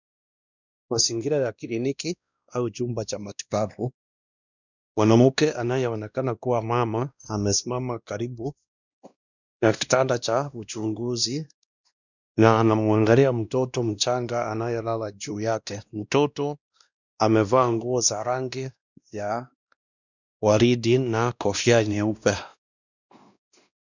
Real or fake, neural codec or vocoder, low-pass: fake; codec, 16 kHz, 1 kbps, X-Codec, WavLM features, trained on Multilingual LibriSpeech; 7.2 kHz